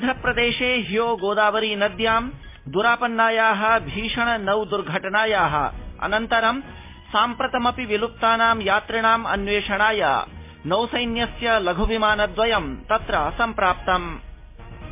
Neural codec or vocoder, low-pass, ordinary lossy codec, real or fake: none; 3.6 kHz; MP3, 24 kbps; real